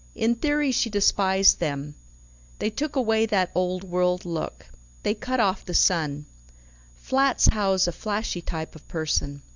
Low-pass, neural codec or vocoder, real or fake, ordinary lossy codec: 7.2 kHz; none; real; Opus, 64 kbps